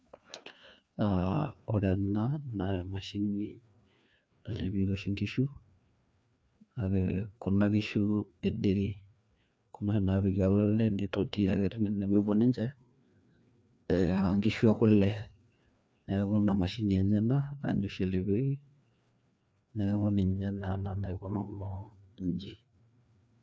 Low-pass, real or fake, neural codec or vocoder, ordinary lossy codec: none; fake; codec, 16 kHz, 2 kbps, FreqCodec, larger model; none